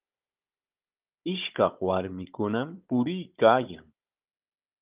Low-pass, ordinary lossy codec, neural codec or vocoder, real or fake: 3.6 kHz; Opus, 32 kbps; codec, 16 kHz, 16 kbps, FunCodec, trained on Chinese and English, 50 frames a second; fake